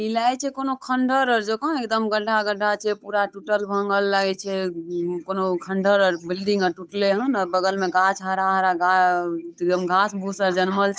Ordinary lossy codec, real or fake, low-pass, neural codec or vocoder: none; fake; none; codec, 16 kHz, 8 kbps, FunCodec, trained on Chinese and English, 25 frames a second